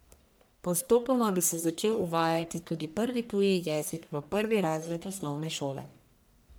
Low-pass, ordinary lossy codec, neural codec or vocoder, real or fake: none; none; codec, 44.1 kHz, 1.7 kbps, Pupu-Codec; fake